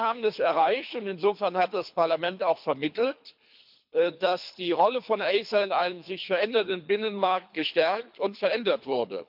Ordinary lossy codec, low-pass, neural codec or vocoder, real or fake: MP3, 48 kbps; 5.4 kHz; codec, 24 kHz, 3 kbps, HILCodec; fake